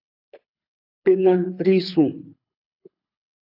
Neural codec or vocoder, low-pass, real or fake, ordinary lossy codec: codec, 24 kHz, 6 kbps, HILCodec; 5.4 kHz; fake; AAC, 48 kbps